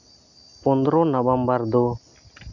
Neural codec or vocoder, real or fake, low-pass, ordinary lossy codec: none; real; 7.2 kHz; MP3, 64 kbps